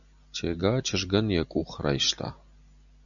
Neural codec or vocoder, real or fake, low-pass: none; real; 7.2 kHz